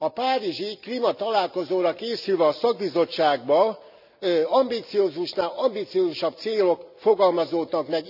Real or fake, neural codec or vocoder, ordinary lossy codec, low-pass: real; none; none; 5.4 kHz